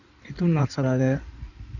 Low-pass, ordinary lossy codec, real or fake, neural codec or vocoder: 7.2 kHz; none; fake; codec, 16 kHz in and 24 kHz out, 2.2 kbps, FireRedTTS-2 codec